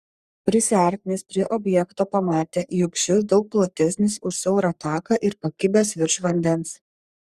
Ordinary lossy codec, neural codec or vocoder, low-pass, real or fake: Opus, 64 kbps; codec, 44.1 kHz, 3.4 kbps, Pupu-Codec; 14.4 kHz; fake